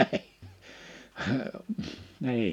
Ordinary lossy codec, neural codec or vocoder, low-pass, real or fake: none; none; 19.8 kHz; real